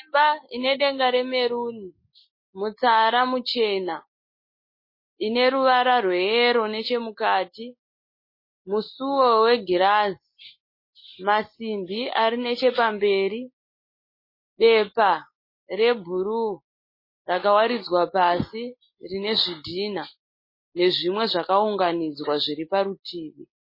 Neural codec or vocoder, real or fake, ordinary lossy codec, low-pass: none; real; MP3, 24 kbps; 5.4 kHz